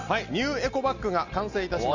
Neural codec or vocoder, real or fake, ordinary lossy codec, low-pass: none; real; none; 7.2 kHz